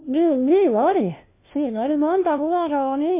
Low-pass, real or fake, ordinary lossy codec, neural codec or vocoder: 3.6 kHz; fake; MP3, 24 kbps; codec, 16 kHz, 1 kbps, FunCodec, trained on LibriTTS, 50 frames a second